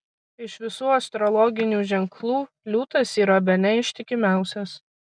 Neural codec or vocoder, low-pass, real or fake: none; 9.9 kHz; real